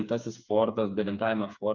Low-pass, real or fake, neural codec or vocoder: 7.2 kHz; fake; codec, 16 kHz, 4 kbps, FreqCodec, smaller model